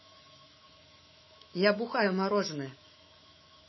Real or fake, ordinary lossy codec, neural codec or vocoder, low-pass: fake; MP3, 24 kbps; autoencoder, 48 kHz, 128 numbers a frame, DAC-VAE, trained on Japanese speech; 7.2 kHz